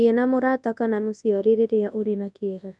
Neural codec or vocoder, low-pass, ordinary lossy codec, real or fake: codec, 24 kHz, 0.9 kbps, WavTokenizer, large speech release; 10.8 kHz; none; fake